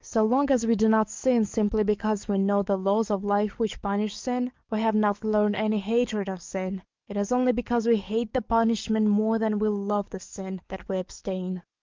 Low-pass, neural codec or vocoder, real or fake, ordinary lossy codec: 7.2 kHz; codec, 44.1 kHz, 7.8 kbps, DAC; fake; Opus, 24 kbps